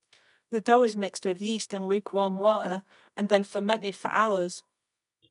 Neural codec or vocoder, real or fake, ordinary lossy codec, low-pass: codec, 24 kHz, 0.9 kbps, WavTokenizer, medium music audio release; fake; none; 10.8 kHz